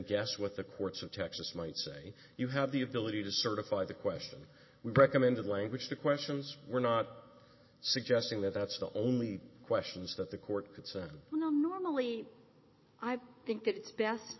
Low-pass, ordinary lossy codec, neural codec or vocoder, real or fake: 7.2 kHz; MP3, 24 kbps; none; real